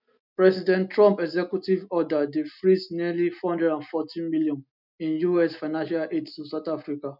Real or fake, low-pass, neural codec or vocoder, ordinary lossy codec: real; 5.4 kHz; none; none